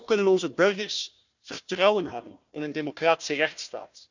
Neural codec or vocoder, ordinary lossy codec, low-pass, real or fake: codec, 16 kHz, 1 kbps, FunCodec, trained on Chinese and English, 50 frames a second; none; 7.2 kHz; fake